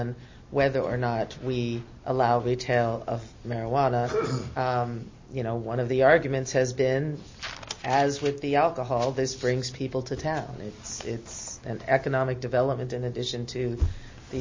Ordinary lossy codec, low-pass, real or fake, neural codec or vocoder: MP3, 32 kbps; 7.2 kHz; real; none